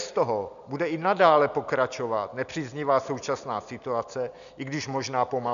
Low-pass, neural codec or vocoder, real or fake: 7.2 kHz; none; real